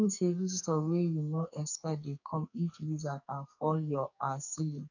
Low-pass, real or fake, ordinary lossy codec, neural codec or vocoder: 7.2 kHz; fake; none; codec, 44.1 kHz, 2.6 kbps, SNAC